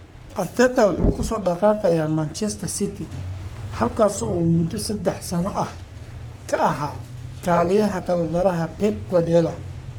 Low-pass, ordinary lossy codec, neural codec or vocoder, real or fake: none; none; codec, 44.1 kHz, 3.4 kbps, Pupu-Codec; fake